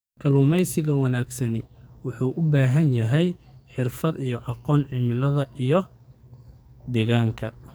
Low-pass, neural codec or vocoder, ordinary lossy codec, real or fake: none; codec, 44.1 kHz, 2.6 kbps, SNAC; none; fake